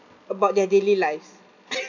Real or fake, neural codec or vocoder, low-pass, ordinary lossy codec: real; none; 7.2 kHz; none